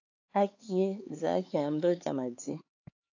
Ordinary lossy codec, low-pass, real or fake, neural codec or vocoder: AAC, 48 kbps; 7.2 kHz; fake; codec, 16 kHz, 4 kbps, X-Codec, HuBERT features, trained on LibriSpeech